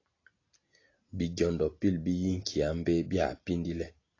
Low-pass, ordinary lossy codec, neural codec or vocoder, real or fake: 7.2 kHz; AAC, 32 kbps; none; real